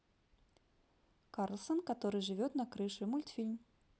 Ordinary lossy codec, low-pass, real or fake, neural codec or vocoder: none; none; real; none